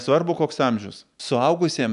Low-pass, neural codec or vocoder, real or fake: 10.8 kHz; none; real